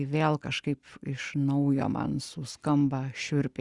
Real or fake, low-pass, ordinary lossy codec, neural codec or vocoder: real; 10.8 kHz; Opus, 64 kbps; none